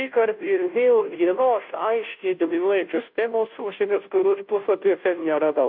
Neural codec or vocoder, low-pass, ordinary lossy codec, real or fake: codec, 16 kHz, 0.5 kbps, FunCodec, trained on Chinese and English, 25 frames a second; 5.4 kHz; MP3, 48 kbps; fake